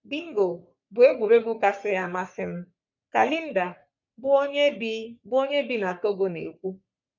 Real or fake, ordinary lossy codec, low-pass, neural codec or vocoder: fake; none; 7.2 kHz; codec, 44.1 kHz, 3.4 kbps, Pupu-Codec